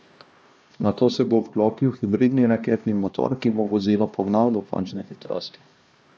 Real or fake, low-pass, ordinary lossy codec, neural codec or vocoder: fake; none; none; codec, 16 kHz, 1 kbps, X-Codec, HuBERT features, trained on LibriSpeech